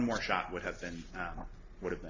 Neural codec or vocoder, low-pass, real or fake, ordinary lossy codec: none; 7.2 kHz; real; AAC, 32 kbps